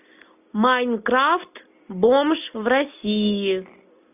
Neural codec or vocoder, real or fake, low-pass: none; real; 3.6 kHz